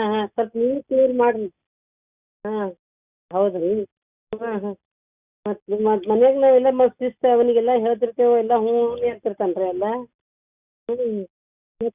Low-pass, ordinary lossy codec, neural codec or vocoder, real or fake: 3.6 kHz; Opus, 64 kbps; none; real